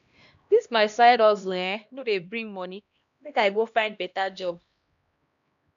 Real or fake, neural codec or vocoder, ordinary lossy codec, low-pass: fake; codec, 16 kHz, 1 kbps, X-Codec, HuBERT features, trained on LibriSpeech; none; 7.2 kHz